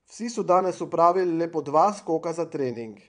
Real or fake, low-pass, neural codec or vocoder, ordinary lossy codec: fake; 9.9 kHz; vocoder, 22.05 kHz, 80 mel bands, Vocos; none